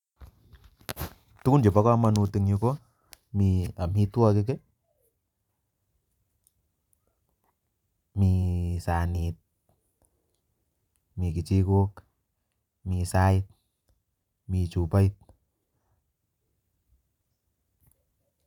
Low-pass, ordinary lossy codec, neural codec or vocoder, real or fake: 19.8 kHz; none; vocoder, 44.1 kHz, 128 mel bands every 512 samples, BigVGAN v2; fake